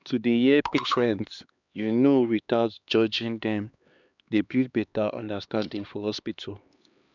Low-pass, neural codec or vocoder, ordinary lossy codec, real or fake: 7.2 kHz; codec, 16 kHz, 2 kbps, X-Codec, HuBERT features, trained on LibriSpeech; none; fake